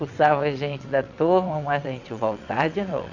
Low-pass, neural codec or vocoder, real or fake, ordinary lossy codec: 7.2 kHz; vocoder, 22.05 kHz, 80 mel bands, WaveNeXt; fake; none